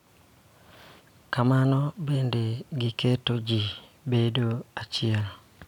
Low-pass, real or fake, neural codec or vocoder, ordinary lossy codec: 19.8 kHz; real; none; none